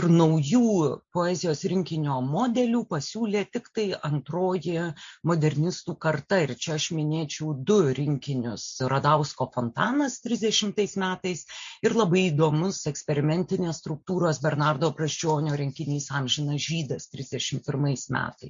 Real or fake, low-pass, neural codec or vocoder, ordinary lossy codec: real; 7.2 kHz; none; MP3, 48 kbps